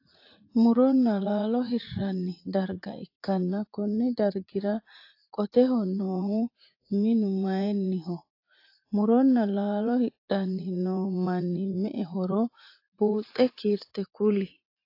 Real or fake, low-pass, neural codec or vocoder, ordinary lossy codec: fake; 5.4 kHz; vocoder, 44.1 kHz, 80 mel bands, Vocos; AAC, 32 kbps